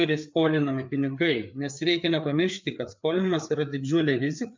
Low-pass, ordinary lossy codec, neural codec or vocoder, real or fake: 7.2 kHz; MP3, 64 kbps; codec, 16 kHz, 4 kbps, FreqCodec, larger model; fake